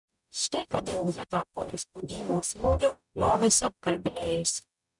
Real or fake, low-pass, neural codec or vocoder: fake; 10.8 kHz; codec, 44.1 kHz, 0.9 kbps, DAC